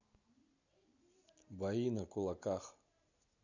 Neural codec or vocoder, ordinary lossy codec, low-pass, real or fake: none; none; 7.2 kHz; real